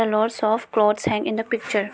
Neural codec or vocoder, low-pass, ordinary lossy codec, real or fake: none; none; none; real